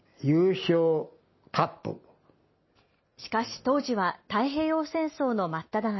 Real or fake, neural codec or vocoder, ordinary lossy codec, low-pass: real; none; MP3, 24 kbps; 7.2 kHz